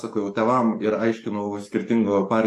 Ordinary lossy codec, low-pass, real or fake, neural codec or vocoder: AAC, 64 kbps; 14.4 kHz; fake; codec, 44.1 kHz, 7.8 kbps, DAC